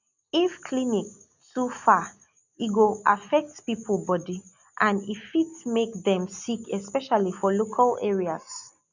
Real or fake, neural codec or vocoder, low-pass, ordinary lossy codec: real; none; 7.2 kHz; none